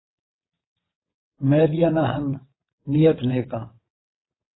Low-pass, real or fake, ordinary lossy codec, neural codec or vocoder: 7.2 kHz; fake; AAC, 16 kbps; vocoder, 22.05 kHz, 80 mel bands, WaveNeXt